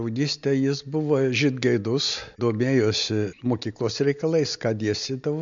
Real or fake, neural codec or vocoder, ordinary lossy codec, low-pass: real; none; MP3, 64 kbps; 7.2 kHz